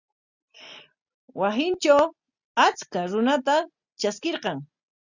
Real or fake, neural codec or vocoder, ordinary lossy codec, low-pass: real; none; Opus, 64 kbps; 7.2 kHz